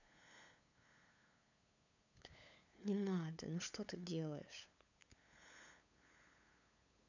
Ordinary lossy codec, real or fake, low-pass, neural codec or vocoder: none; fake; 7.2 kHz; codec, 16 kHz, 8 kbps, FunCodec, trained on LibriTTS, 25 frames a second